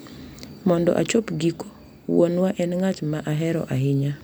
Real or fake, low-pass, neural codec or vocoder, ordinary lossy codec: real; none; none; none